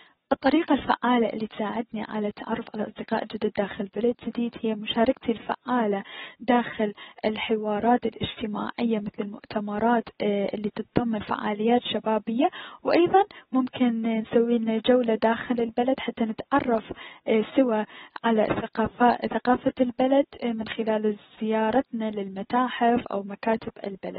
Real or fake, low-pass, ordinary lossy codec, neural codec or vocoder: real; 9.9 kHz; AAC, 16 kbps; none